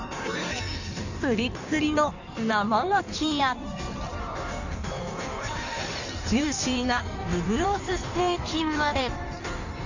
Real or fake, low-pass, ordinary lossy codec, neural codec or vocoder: fake; 7.2 kHz; none; codec, 16 kHz in and 24 kHz out, 1.1 kbps, FireRedTTS-2 codec